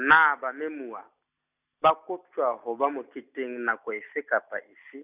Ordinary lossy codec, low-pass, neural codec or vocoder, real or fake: none; 3.6 kHz; none; real